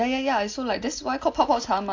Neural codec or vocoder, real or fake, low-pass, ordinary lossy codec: none; real; 7.2 kHz; AAC, 48 kbps